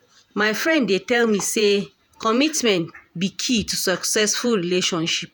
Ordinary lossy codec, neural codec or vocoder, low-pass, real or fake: none; vocoder, 48 kHz, 128 mel bands, Vocos; none; fake